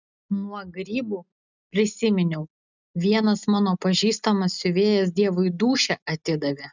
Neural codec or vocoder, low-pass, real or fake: none; 7.2 kHz; real